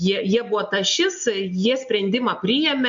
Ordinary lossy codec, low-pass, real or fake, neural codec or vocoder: MP3, 48 kbps; 7.2 kHz; real; none